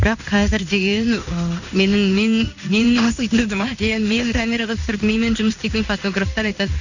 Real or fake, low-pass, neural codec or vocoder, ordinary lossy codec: fake; 7.2 kHz; codec, 16 kHz in and 24 kHz out, 1 kbps, XY-Tokenizer; none